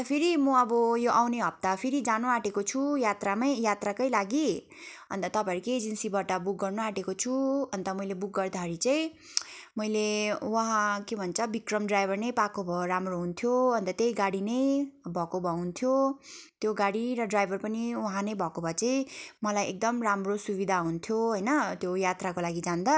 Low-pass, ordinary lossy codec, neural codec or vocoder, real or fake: none; none; none; real